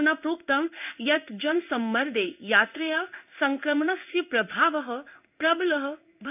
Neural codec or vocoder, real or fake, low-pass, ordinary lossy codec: codec, 16 kHz in and 24 kHz out, 1 kbps, XY-Tokenizer; fake; 3.6 kHz; none